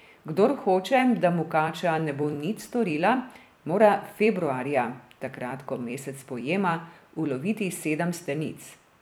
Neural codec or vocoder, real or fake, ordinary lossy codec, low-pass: vocoder, 44.1 kHz, 128 mel bands every 256 samples, BigVGAN v2; fake; none; none